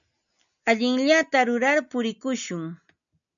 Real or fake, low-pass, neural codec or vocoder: real; 7.2 kHz; none